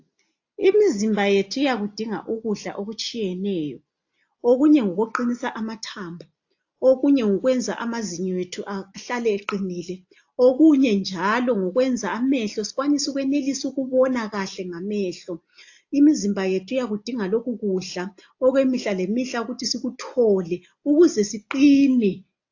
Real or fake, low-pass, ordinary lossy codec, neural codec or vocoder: real; 7.2 kHz; AAC, 48 kbps; none